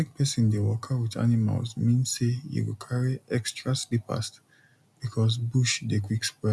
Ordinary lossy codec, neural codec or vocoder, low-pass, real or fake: none; none; none; real